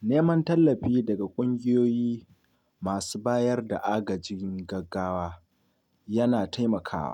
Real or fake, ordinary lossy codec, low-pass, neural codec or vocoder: real; none; 19.8 kHz; none